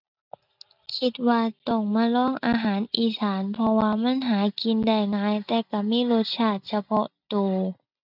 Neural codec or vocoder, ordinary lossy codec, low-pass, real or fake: none; none; 5.4 kHz; real